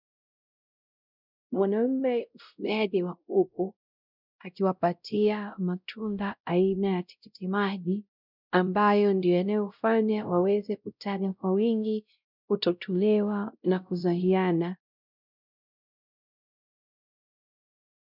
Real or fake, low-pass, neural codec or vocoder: fake; 5.4 kHz; codec, 16 kHz, 0.5 kbps, X-Codec, WavLM features, trained on Multilingual LibriSpeech